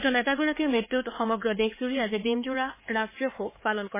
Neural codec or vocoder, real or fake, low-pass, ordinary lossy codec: codec, 16 kHz, 4 kbps, X-Codec, HuBERT features, trained on LibriSpeech; fake; 3.6 kHz; MP3, 16 kbps